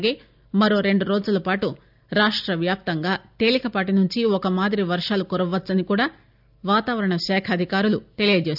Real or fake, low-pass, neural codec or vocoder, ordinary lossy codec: real; 5.4 kHz; none; none